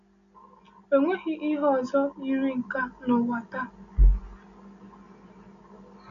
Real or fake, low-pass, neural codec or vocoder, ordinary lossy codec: real; 7.2 kHz; none; none